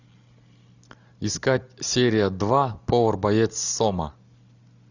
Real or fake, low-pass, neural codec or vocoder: real; 7.2 kHz; none